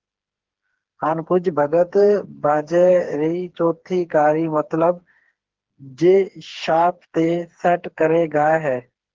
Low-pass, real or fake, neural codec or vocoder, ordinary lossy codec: 7.2 kHz; fake; codec, 16 kHz, 4 kbps, FreqCodec, smaller model; Opus, 16 kbps